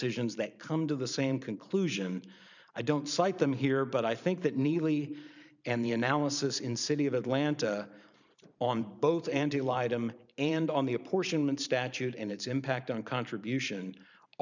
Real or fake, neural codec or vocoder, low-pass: fake; vocoder, 44.1 kHz, 128 mel bands every 512 samples, BigVGAN v2; 7.2 kHz